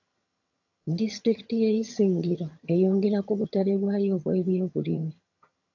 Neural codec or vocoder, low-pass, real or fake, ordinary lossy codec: vocoder, 22.05 kHz, 80 mel bands, HiFi-GAN; 7.2 kHz; fake; AAC, 48 kbps